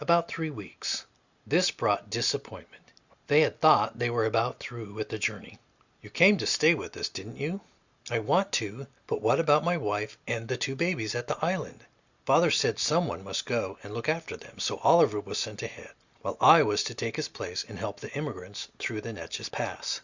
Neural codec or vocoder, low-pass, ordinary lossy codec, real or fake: none; 7.2 kHz; Opus, 64 kbps; real